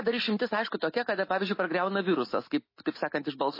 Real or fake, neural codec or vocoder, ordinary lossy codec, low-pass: real; none; MP3, 24 kbps; 5.4 kHz